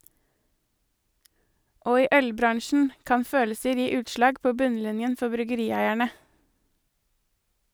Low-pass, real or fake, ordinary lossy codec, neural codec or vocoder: none; real; none; none